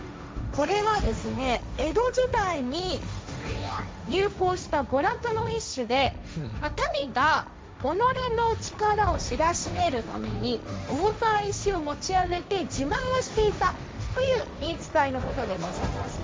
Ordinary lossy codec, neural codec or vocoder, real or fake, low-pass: none; codec, 16 kHz, 1.1 kbps, Voila-Tokenizer; fake; none